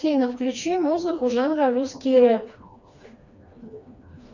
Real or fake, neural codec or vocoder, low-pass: fake; codec, 16 kHz, 2 kbps, FreqCodec, smaller model; 7.2 kHz